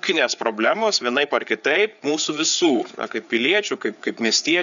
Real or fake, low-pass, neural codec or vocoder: fake; 7.2 kHz; codec, 16 kHz, 4 kbps, FreqCodec, larger model